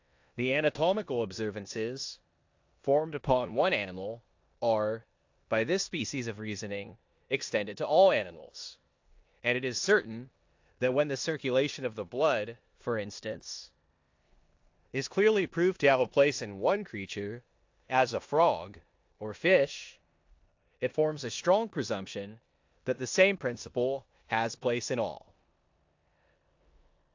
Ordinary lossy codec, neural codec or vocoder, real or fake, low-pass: AAC, 48 kbps; codec, 16 kHz in and 24 kHz out, 0.9 kbps, LongCat-Audio-Codec, four codebook decoder; fake; 7.2 kHz